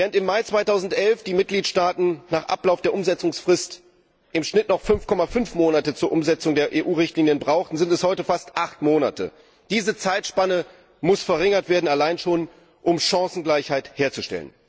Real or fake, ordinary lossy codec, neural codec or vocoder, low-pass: real; none; none; none